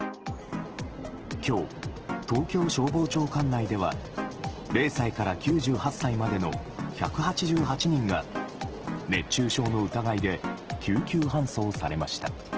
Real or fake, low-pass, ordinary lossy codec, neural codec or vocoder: real; 7.2 kHz; Opus, 16 kbps; none